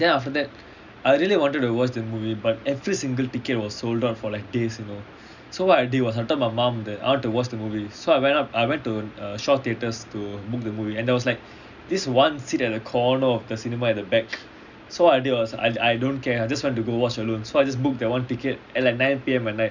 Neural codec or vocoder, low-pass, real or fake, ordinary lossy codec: none; 7.2 kHz; real; none